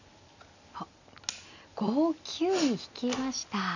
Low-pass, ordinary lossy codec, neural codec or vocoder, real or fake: 7.2 kHz; none; none; real